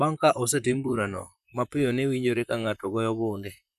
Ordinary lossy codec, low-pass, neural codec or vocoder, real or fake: none; 14.4 kHz; vocoder, 44.1 kHz, 128 mel bands, Pupu-Vocoder; fake